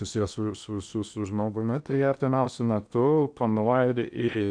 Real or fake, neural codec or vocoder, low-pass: fake; codec, 16 kHz in and 24 kHz out, 0.8 kbps, FocalCodec, streaming, 65536 codes; 9.9 kHz